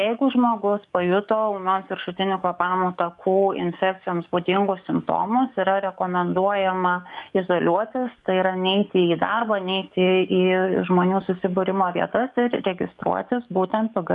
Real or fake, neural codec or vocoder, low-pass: fake; codec, 44.1 kHz, 7.8 kbps, DAC; 10.8 kHz